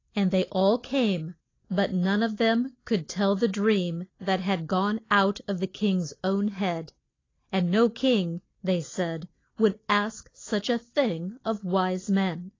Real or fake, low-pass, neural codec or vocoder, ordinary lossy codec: real; 7.2 kHz; none; AAC, 32 kbps